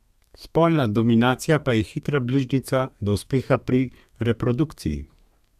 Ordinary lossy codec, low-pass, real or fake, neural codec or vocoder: MP3, 96 kbps; 14.4 kHz; fake; codec, 32 kHz, 1.9 kbps, SNAC